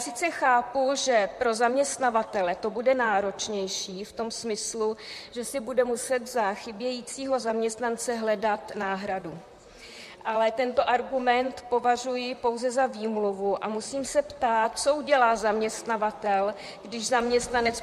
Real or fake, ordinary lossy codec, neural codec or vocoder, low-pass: fake; MP3, 64 kbps; vocoder, 44.1 kHz, 128 mel bands, Pupu-Vocoder; 14.4 kHz